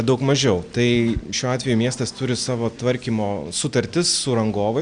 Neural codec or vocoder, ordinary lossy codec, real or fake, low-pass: none; Opus, 64 kbps; real; 9.9 kHz